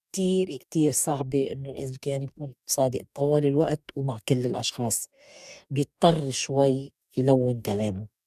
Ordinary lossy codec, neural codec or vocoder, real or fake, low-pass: none; codec, 44.1 kHz, 2.6 kbps, DAC; fake; 14.4 kHz